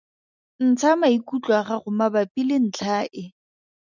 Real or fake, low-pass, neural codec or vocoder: real; 7.2 kHz; none